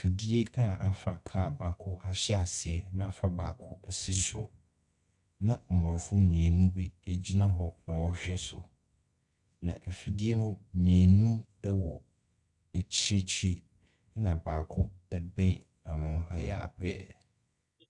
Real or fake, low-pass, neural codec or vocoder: fake; 10.8 kHz; codec, 24 kHz, 0.9 kbps, WavTokenizer, medium music audio release